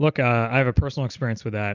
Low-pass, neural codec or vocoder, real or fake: 7.2 kHz; none; real